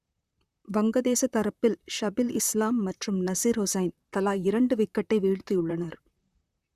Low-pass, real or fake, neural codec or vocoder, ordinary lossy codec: 14.4 kHz; fake; vocoder, 44.1 kHz, 128 mel bands, Pupu-Vocoder; Opus, 64 kbps